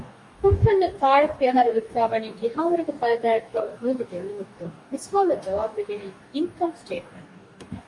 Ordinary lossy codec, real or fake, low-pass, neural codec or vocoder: MP3, 48 kbps; fake; 10.8 kHz; codec, 44.1 kHz, 2.6 kbps, DAC